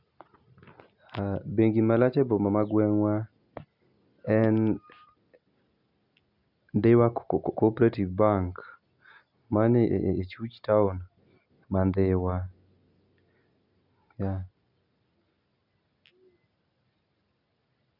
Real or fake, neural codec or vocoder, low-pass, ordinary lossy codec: real; none; 5.4 kHz; none